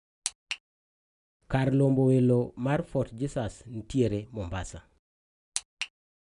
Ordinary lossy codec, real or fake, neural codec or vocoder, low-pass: none; real; none; 10.8 kHz